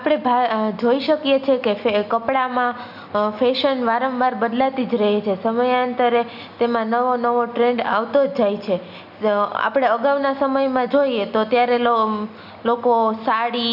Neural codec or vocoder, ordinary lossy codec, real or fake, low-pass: none; none; real; 5.4 kHz